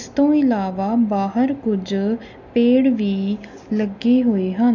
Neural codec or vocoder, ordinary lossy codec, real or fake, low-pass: none; none; real; 7.2 kHz